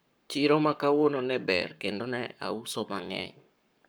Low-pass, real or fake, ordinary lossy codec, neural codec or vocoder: none; fake; none; codec, 44.1 kHz, 7.8 kbps, Pupu-Codec